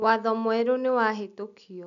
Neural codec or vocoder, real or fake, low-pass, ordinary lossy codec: none; real; 7.2 kHz; none